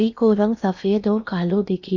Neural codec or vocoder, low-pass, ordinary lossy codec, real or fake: codec, 16 kHz in and 24 kHz out, 0.8 kbps, FocalCodec, streaming, 65536 codes; 7.2 kHz; none; fake